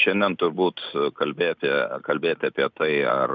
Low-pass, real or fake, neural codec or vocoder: 7.2 kHz; real; none